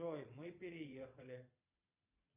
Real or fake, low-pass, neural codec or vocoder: fake; 3.6 kHz; vocoder, 22.05 kHz, 80 mel bands, Vocos